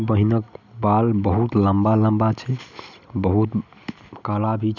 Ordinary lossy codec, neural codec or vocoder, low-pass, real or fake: none; none; 7.2 kHz; real